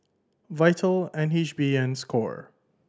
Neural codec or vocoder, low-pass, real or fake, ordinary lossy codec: none; none; real; none